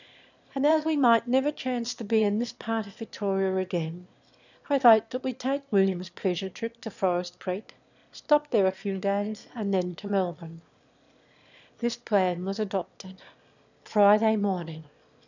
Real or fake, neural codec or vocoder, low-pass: fake; autoencoder, 22.05 kHz, a latent of 192 numbers a frame, VITS, trained on one speaker; 7.2 kHz